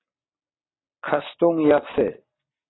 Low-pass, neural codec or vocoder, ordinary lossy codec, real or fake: 7.2 kHz; none; AAC, 16 kbps; real